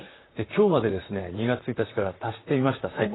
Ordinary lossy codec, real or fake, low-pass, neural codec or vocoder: AAC, 16 kbps; fake; 7.2 kHz; vocoder, 44.1 kHz, 128 mel bands, Pupu-Vocoder